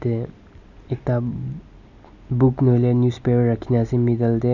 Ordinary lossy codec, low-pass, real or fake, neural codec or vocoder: none; 7.2 kHz; real; none